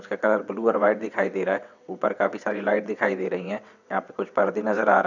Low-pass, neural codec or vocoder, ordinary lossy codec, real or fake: 7.2 kHz; vocoder, 22.05 kHz, 80 mel bands, WaveNeXt; none; fake